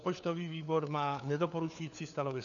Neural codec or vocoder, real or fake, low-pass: codec, 16 kHz, 4 kbps, FunCodec, trained on LibriTTS, 50 frames a second; fake; 7.2 kHz